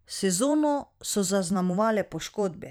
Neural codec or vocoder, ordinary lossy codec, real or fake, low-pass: vocoder, 44.1 kHz, 128 mel bands every 512 samples, BigVGAN v2; none; fake; none